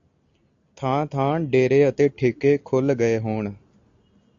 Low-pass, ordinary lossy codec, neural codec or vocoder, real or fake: 7.2 kHz; AAC, 64 kbps; none; real